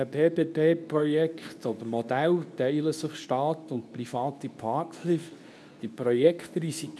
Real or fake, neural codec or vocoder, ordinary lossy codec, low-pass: fake; codec, 24 kHz, 0.9 kbps, WavTokenizer, medium speech release version 2; none; none